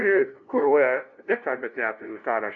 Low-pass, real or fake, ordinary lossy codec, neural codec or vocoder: 7.2 kHz; fake; MP3, 64 kbps; codec, 16 kHz, 0.5 kbps, FunCodec, trained on LibriTTS, 25 frames a second